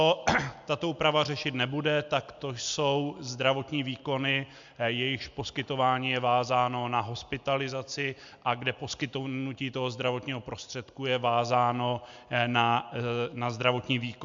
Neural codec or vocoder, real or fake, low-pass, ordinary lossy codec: none; real; 7.2 kHz; MP3, 64 kbps